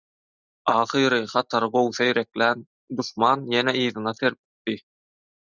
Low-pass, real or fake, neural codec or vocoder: 7.2 kHz; real; none